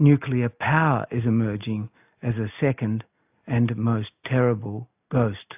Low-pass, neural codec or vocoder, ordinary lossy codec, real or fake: 3.6 kHz; none; AAC, 32 kbps; real